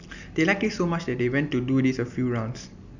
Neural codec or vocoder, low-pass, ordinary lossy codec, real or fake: none; 7.2 kHz; none; real